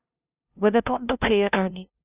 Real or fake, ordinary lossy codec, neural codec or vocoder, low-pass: fake; Opus, 64 kbps; codec, 16 kHz, 0.5 kbps, FunCodec, trained on LibriTTS, 25 frames a second; 3.6 kHz